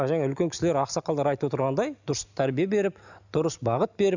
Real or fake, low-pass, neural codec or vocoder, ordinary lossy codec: real; 7.2 kHz; none; none